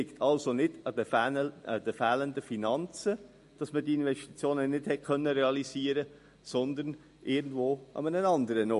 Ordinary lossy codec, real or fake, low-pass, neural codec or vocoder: MP3, 48 kbps; real; 14.4 kHz; none